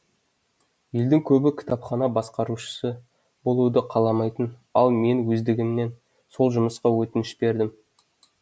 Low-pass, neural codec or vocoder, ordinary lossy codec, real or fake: none; none; none; real